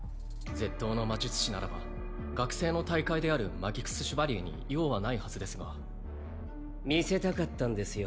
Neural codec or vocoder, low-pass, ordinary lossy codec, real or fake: none; none; none; real